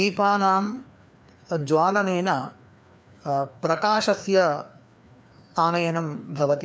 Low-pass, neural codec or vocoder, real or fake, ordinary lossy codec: none; codec, 16 kHz, 2 kbps, FreqCodec, larger model; fake; none